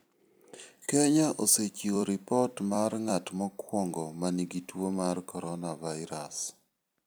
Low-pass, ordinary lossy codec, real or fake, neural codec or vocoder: none; none; fake; vocoder, 44.1 kHz, 128 mel bands every 256 samples, BigVGAN v2